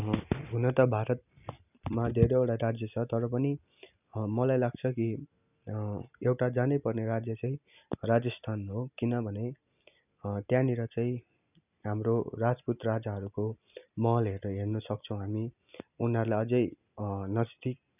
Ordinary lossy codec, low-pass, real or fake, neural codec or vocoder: none; 3.6 kHz; real; none